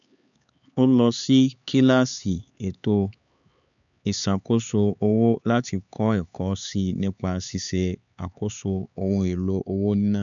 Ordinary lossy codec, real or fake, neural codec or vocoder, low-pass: none; fake; codec, 16 kHz, 4 kbps, X-Codec, HuBERT features, trained on LibriSpeech; 7.2 kHz